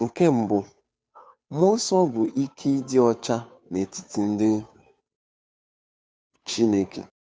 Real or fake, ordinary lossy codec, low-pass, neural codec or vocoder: fake; none; none; codec, 16 kHz, 2 kbps, FunCodec, trained on Chinese and English, 25 frames a second